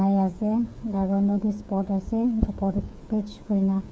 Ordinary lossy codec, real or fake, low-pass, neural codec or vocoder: none; fake; none; codec, 16 kHz, 8 kbps, FreqCodec, smaller model